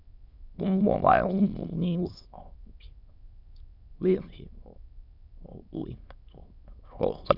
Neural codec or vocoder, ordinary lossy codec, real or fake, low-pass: autoencoder, 22.05 kHz, a latent of 192 numbers a frame, VITS, trained on many speakers; none; fake; 5.4 kHz